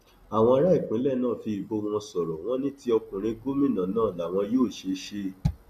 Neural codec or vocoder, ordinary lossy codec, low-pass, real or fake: none; none; 14.4 kHz; real